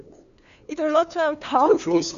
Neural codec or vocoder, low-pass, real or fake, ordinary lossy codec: codec, 16 kHz, 2 kbps, FunCodec, trained on LibriTTS, 25 frames a second; 7.2 kHz; fake; none